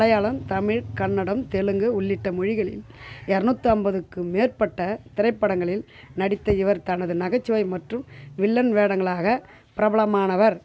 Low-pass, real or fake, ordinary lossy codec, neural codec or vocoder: none; real; none; none